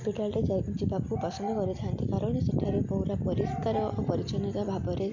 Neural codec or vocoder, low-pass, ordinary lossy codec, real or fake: none; 7.2 kHz; none; real